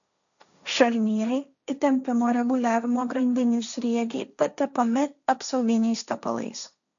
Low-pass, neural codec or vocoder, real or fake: 7.2 kHz; codec, 16 kHz, 1.1 kbps, Voila-Tokenizer; fake